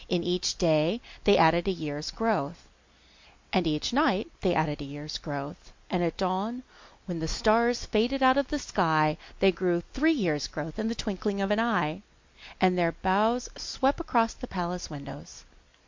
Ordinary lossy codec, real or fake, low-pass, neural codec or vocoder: MP3, 48 kbps; real; 7.2 kHz; none